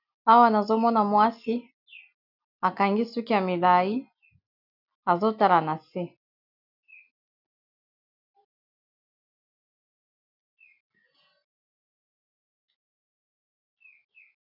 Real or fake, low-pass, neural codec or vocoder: real; 5.4 kHz; none